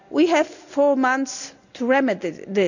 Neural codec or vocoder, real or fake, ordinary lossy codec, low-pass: none; real; none; 7.2 kHz